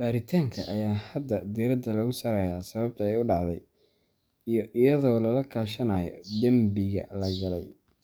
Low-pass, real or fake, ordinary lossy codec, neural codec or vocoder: none; fake; none; codec, 44.1 kHz, 7.8 kbps, DAC